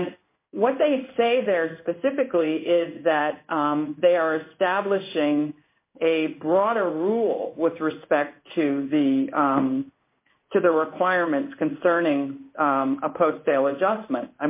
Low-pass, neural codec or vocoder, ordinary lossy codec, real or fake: 3.6 kHz; codec, 16 kHz in and 24 kHz out, 1 kbps, XY-Tokenizer; MP3, 24 kbps; fake